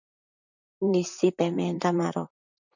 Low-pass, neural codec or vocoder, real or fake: 7.2 kHz; vocoder, 44.1 kHz, 128 mel bands, Pupu-Vocoder; fake